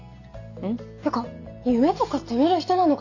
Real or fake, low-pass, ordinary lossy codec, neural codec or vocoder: real; 7.2 kHz; none; none